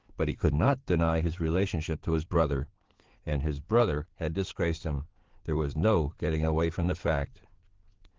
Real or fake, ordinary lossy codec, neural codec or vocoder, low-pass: fake; Opus, 16 kbps; codec, 16 kHz in and 24 kHz out, 2.2 kbps, FireRedTTS-2 codec; 7.2 kHz